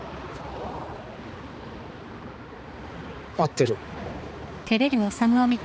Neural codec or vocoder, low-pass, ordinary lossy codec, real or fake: codec, 16 kHz, 4 kbps, X-Codec, HuBERT features, trained on balanced general audio; none; none; fake